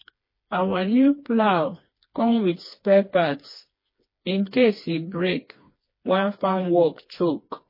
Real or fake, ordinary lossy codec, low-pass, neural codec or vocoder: fake; MP3, 24 kbps; 5.4 kHz; codec, 16 kHz, 2 kbps, FreqCodec, smaller model